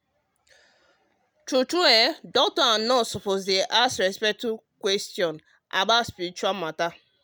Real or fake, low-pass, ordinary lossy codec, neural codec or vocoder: real; none; none; none